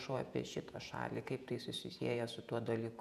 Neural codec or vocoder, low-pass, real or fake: vocoder, 48 kHz, 128 mel bands, Vocos; 14.4 kHz; fake